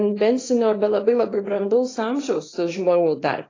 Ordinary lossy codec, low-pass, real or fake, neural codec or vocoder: AAC, 32 kbps; 7.2 kHz; fake; codec, 24 kHz, 0.9 kbps, WavTokenizer, small release